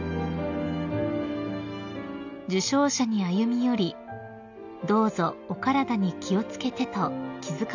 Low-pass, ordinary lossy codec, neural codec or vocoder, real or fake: 7.2 kHz; none; none; real